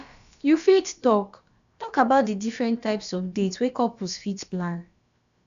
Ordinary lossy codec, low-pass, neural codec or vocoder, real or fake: AAC, 96 kbps; 7.2 kHz; codec, 16 kHz, about 1 kbps, DyCAST, with the encoder's durations; fake